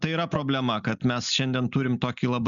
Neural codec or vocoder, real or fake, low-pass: none; real; 7.2 kHz